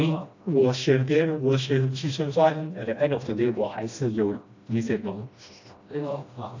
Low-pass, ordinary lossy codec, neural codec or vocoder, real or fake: 7.2 kHz; AAC, 48 kbps; codec, 16 kHz, 1 kbps, FreqCodec, smaller model; fake